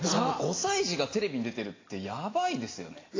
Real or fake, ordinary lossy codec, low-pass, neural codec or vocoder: real; AAC, 32 kbps; 7.2 kHz; none